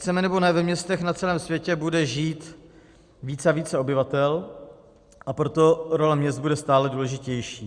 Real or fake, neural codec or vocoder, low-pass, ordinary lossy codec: real; none; 9.9 kHz; Opus, 64 kbps